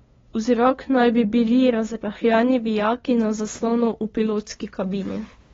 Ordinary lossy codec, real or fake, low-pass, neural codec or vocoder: AAC, 24 kbps; fake; 7.2 kHz; codec, 16 kHz, 2 kbps, FunCodec, trained on LibriTTS, 25 frames a second